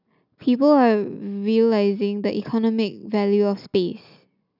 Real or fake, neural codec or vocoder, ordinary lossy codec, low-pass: real; none; none; 5.4 kHz